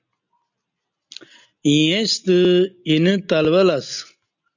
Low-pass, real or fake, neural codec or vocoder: 7.2 kHz; real; none